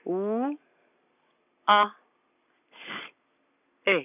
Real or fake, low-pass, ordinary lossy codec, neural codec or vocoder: real; 3.6 kHz; none; none